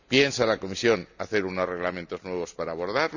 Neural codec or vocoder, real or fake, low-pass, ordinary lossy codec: none; real; 7.2 kHz; none